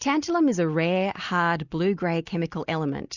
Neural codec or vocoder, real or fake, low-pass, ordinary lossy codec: none; real; 7.2 kHz; Opus, 64 kbps